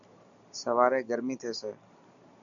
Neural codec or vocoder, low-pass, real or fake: none; 7.2 kHz; real